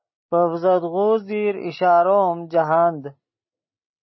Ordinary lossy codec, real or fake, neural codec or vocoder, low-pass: MP3, 24 kbps; real; none; 7.2 kHz